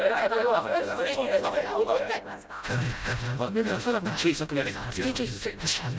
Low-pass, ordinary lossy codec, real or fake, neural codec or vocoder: none; none; fake; codec, 16 kHz, 0.5 kbps, FreqCodec, smaller model